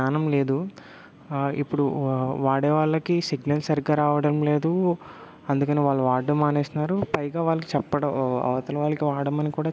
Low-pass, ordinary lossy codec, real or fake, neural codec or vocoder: none; none; real; none